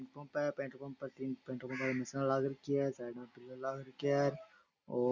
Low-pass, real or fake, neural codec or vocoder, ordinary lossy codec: 7.2 kHz; real; none; none